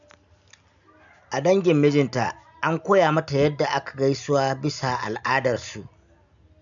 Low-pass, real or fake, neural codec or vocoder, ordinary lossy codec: 7.2 kHz; real; none; MP3, 96 kbps